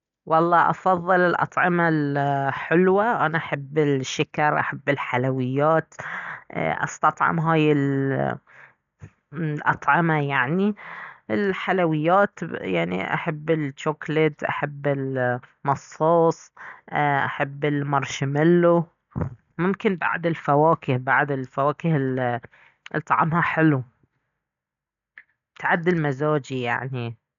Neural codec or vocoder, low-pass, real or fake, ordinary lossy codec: none; 7.2 kHz; real; none